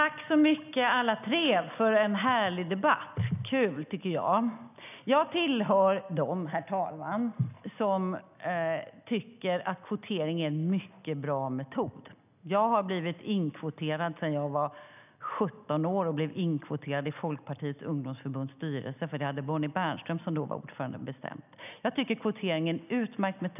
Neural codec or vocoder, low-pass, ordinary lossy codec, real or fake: none; 3.6 kHz; none; real